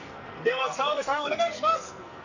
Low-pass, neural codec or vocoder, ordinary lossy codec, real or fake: 7.2 kHz; codec, 44.1 kHz, 2.6 kbps, SNAC; none; fake